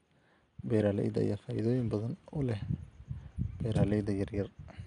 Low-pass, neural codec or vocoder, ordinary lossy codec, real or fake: 9.9 kHz; none; MP3, 96 kbps; real